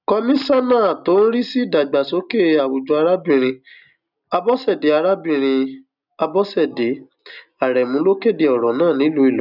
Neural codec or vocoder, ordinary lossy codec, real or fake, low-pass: none; none; real; 5.4 kHz